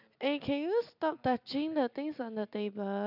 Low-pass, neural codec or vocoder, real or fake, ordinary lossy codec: 5.4 kHz; none; real; none